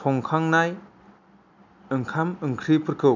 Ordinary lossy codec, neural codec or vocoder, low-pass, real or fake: none; none; 7.2 kHz; real